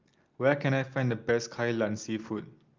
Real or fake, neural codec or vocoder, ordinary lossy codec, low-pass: real; none; Opus, 24 kbps; 7.2 kHz